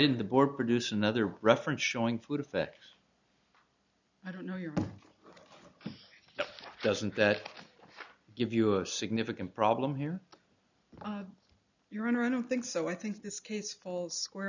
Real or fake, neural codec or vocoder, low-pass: real; none; 7.2 kHz